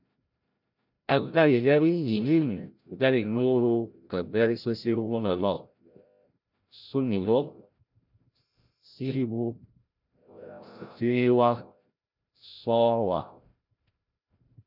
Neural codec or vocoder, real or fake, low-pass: codec, 16 kHz, 0.5 kbps, FreqCodec, larger model; fake; 5.4 kHz